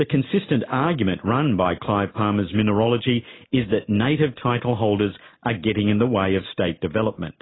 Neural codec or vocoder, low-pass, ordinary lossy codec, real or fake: none; 7.2 kHz; AAC, 16 kbps; real